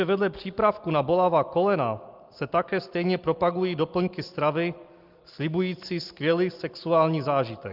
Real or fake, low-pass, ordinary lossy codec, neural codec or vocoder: real; 5.4 kHz; Opus, 32 kbps; none